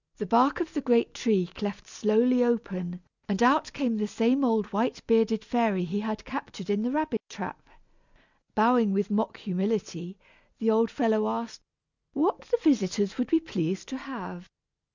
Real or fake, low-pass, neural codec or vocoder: real; 7.2 kHz; none